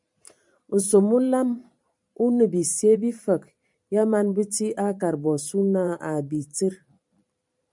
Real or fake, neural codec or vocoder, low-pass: fake; vocoder, 44.1 kHz, 128 mel bands every 512 samples, BigVGAN v2; 10.8 kHz